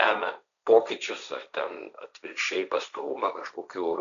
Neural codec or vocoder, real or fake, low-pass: codec, 16 kHz, 1.1 kbps, Voila-Tokenizer; fake; 7.2 kHz